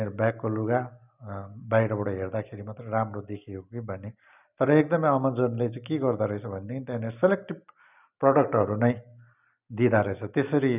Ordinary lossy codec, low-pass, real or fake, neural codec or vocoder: none; 3.6 kHz; real; none